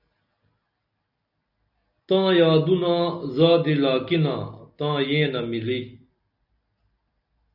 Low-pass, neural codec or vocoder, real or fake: 5.4 kHz; none; real